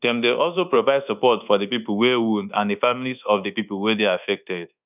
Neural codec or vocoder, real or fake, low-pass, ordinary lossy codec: codec, 24 kHz, 1.2 kbps, DualCodec; fake; 3.6 kHz; none